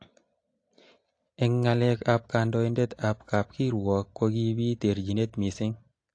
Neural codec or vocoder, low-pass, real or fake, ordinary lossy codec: none; 9.9 kHz; real; AAC, 48 kbps